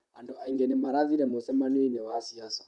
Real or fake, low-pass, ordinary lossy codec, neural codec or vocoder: fake; 10.8 kHz; none; vocoder, 44.1 kHz, 128 mel bands, Pupu-Vocoder